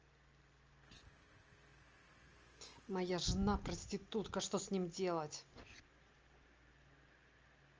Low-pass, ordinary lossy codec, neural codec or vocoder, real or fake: 7.2 kHz; Opus, 24 kbps; none; real